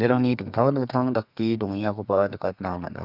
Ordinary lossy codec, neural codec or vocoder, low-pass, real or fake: none; codec, 32 kHz, 1.9 kbps, SNAC; 5.4 kHz; fake